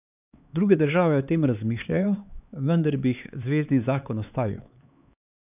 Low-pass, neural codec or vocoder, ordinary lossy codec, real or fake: 3.6 kHz; codec, 16 kHz, 4 kbps, X-Codec, HuBERT features, trained on LibriSpeech; none; fake